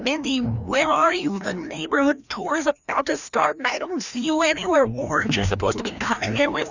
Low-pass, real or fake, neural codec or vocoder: 7.2 kHz; fake; codec, 16 kHz, 1 kbps, FreqCodec, larger model